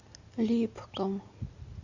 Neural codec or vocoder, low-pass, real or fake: none; 7.2 kHz; real